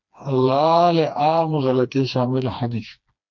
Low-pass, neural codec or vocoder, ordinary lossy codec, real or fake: 7.2 kHz; codec, 16 kHz, 2 kbps, FreqCodec, smaller model; MP3, 48 kbps; fake